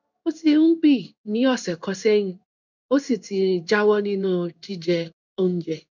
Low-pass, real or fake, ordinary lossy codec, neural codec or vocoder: 7.2 kHz; fake; none; codec, 16 kHz in and 24 kHz out, 1 kbps, XY-Tokenizer